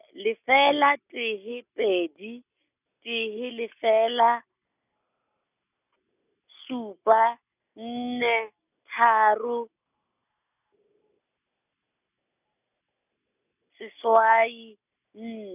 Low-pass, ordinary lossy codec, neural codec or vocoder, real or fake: 3.6 kHz; none; none; real